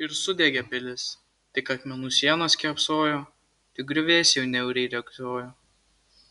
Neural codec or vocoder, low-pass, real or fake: none; 10.8 kHz; real